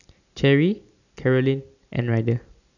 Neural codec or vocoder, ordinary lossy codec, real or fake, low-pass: none; none; real; 7.2 kHz